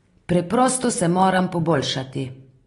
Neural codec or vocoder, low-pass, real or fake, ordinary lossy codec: none; 10.8 kHz; real; AAC, 32 kbps